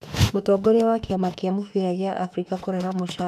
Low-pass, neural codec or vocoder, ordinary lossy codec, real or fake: 14.4 kHz; autoencoder, 48 kHz, 32 numbers a frame, DAC-VAE, trained on Japanese speech; none; fake